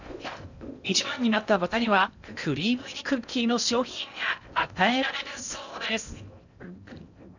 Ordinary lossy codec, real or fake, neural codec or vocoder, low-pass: none; fake; codec, 16 kHz in and 24 kHz out, 0.6 kbps, FocalCodec, streaming, 2048 codes; 7.2 kHz